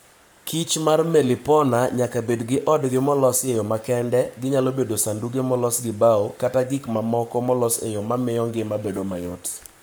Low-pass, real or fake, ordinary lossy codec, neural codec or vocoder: none; fake; none; codec, 44.1 kHz, 7.8 kbps, Pupu-Codec